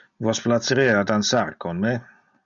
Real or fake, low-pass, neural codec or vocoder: real; 7.2 kHz; none